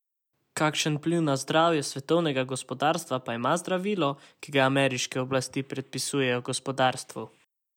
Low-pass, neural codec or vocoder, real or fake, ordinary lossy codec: 19.8 kHz; none; real; none